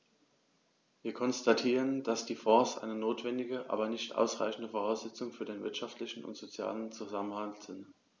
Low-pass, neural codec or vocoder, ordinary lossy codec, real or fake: none; none; none; real